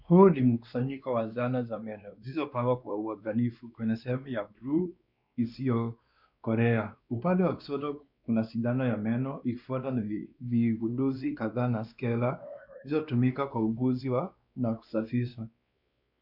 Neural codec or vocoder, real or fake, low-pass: codec, 16 kHz, 2 kbps, X-Codec, WavLM features, trained on Multilingual LibriSpeech; fake; 5.4 kHz